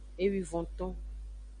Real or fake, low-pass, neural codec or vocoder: real; 9.9 kHz; none